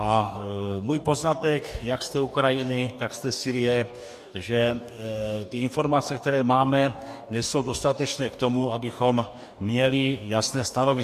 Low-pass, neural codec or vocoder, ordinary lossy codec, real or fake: 14.4 kHz; codec, 44.1 kHz, 2.6 kbps, DAC; MP3, 96 kbps; fake